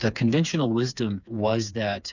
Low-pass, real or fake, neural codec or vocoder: 7.2 kHz; fake; codec, 16 kHz, 4 kbps, FreqCodec, smaller model